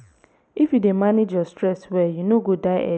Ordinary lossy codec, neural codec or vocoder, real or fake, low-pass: none; none; real; none